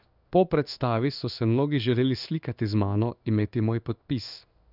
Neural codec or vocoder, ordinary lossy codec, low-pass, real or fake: codec, 16 kHz in and 24 kHz out, 1 kbps, XY-Tokenizer; none; 5.4 kHz; fake